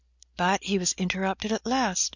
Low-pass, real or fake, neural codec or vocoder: 7.2 kHz; real; none